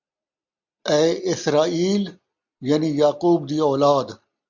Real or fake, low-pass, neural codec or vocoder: real; 7.2 kHz; none